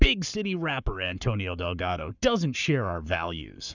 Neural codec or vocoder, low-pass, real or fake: codec, 44.1 kHz, 7.8 kbps, Pupu-Codec; 7.2 kHz; fake